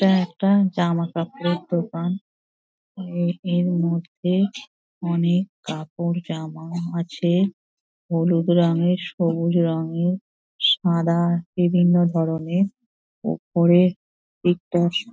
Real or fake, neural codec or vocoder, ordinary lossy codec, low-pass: real; none; none; none